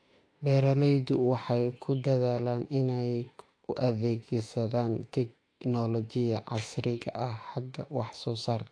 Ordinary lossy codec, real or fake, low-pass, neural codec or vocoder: AAC, 48 kbps; fake; 9.9 kHz; autoencoder, 48 kHz, 32 numbers a frame, DAC-VAE, trained on Japanese speech